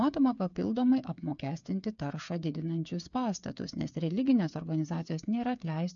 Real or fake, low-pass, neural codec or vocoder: fake; 7.2 kHz; codec, 16 kHz, 8 kbps, FreqCodec, smaller model